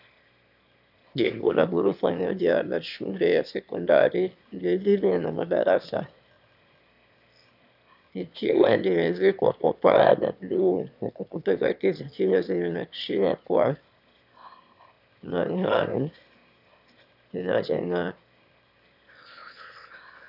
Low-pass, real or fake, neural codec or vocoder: 5.4 kHz; fake; autoencoder, 22.05 kHz, a latent of 192 numbers a frame, VITS, trained on one speaker